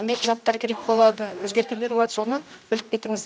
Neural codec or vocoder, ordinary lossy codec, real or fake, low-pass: codec, 16 kHz, 1 kbps, X-Codec, HuBERT features, trained on general audio; none; fake; none